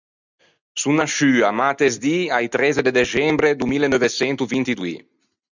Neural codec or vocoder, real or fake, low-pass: none; real; 7.2 kHz